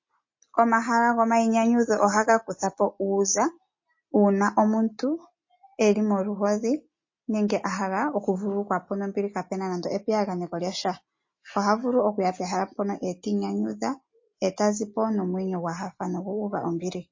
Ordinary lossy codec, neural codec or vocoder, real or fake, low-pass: MP3, 32 kbps; none; real; 7.2 kHz